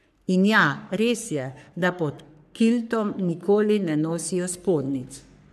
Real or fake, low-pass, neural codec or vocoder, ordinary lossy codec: fake; 14.4 kHz; codec, 44.1 kHz, 3.4 kbps, Pupu-Codec; none